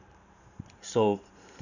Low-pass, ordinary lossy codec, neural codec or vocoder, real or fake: 7.2 kHz; none; vocoder, 44.1 kHz, 128 mel bands every 512 samples, BigVGAN v2; fake